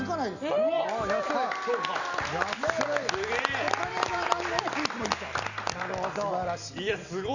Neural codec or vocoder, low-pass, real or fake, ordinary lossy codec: none; 7.2 kHz; real; none